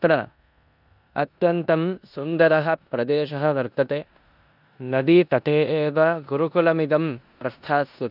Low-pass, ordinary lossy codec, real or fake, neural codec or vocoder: 5.4 kHz; none; fake; codec, 16 kHz in and 24 kHz out, 0.9 kbps, LongCat-Audio-Codec, four codebook decoder